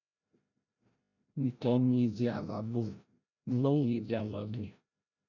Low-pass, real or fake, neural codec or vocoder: 7.2 kHz; fake; codec, 16 kHz, 0.5 kbps, FreqCodec, larger model